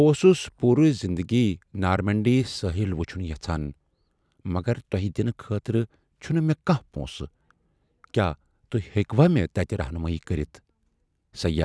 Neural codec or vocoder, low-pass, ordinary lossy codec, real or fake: none; none; none; real